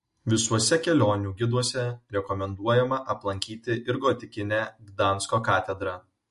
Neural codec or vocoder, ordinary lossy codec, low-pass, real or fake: none; MP3, 48 kbps; 14.4 kHz; real